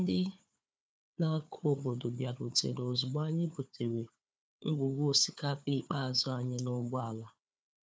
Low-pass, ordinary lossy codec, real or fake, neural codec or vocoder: none; none; fake; codec, 16 kHz, 4 kbps, FunCodec, trained on Chinese and English, 50 frames a second